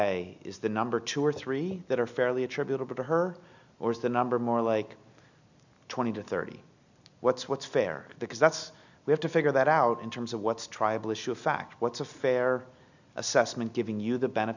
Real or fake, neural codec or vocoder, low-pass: real; none; 7.2 kHz